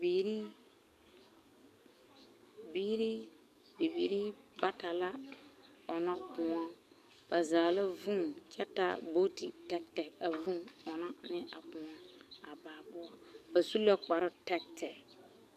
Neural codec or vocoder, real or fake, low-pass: codec, 44.1 kHz, 7.8 kbps, DAC; fake; 14.4 kHz